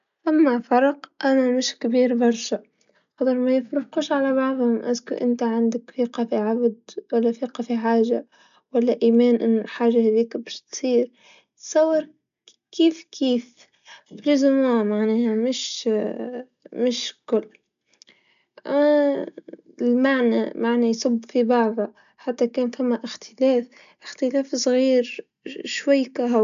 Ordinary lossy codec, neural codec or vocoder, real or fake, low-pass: none; none; real; 7.2 kHz